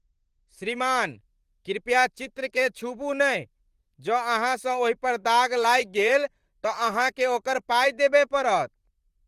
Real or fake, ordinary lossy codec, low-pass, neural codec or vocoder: fake; Opus, 24 kbps; 14.4 kHz; vocoder, 44.1 kHz, 128 mel bands, Pupu-Vocoder